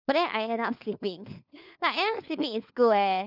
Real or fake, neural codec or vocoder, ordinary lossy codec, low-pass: fake; codec, 16 kHz, 4 kbps, FunCodec, trained on LibriTTS, 50 frames a second; none; 5.4 kHz